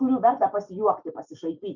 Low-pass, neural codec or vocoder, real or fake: 7.2 kHz; none; real